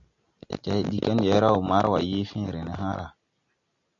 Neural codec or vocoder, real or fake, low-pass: none; real; 7.2 kHz